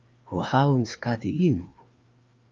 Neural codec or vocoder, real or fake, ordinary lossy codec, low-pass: codec, 16 kHz, 2 kbps, FreqCodec, larger model; fake; Opus, 32 kbps; 7.2 kHz